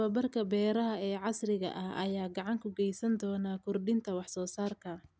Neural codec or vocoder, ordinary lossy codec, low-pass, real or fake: none; none; none; real